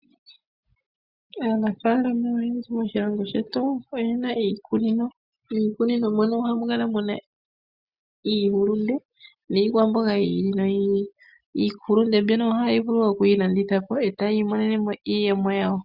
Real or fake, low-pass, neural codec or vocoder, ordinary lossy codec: real; 5.4 kHz; none; Opus, 64 kbps